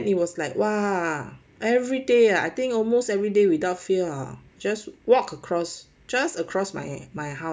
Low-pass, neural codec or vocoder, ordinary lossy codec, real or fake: none; none; none; real